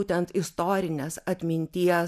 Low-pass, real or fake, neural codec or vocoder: 14.4 kHz; real; none